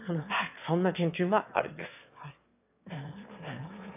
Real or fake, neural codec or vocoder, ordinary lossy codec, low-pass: fake; autoencoder, 22.05 kHz, a latent of 192 numbers a frame, VITS, trained on one speaker; none; 3.6 kHz